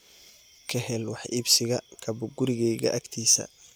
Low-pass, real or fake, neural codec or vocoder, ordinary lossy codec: none; real; none; none